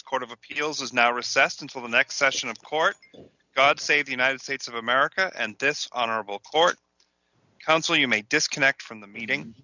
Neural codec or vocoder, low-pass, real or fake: none; 7.2 kHz; real